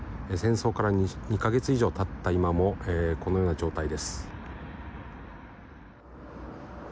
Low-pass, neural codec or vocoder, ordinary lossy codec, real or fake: none; none; none; real